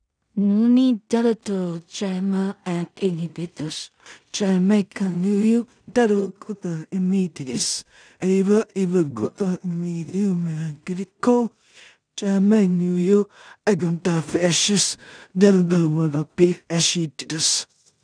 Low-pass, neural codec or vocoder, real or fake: 9.9 kHz; codec, 16 kHz in and 24 kHz out, 0.4 kbps, LongCat-Audio-Codec, two codebook decoder; fake